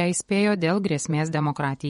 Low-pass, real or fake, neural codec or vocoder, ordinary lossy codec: 10.8 kHz; fake; vocoder, 24 kHz, 100 mel bands, Vocos; MP3, 48 kbps